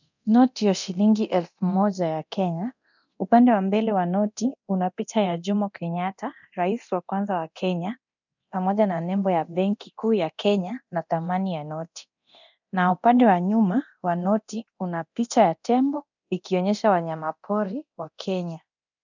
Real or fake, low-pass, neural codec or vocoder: fake; 7.2 kHz; codec, 24 kHz, 0.9 kbps, DualCodec